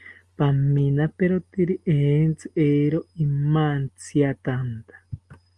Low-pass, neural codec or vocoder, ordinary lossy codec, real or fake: 10.8 kHz; none; Opus, 32 kbps; real